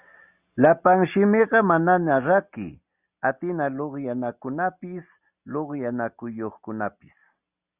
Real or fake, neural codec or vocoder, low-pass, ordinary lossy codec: real; none; 3.6 kHz; Opus, 64 kbps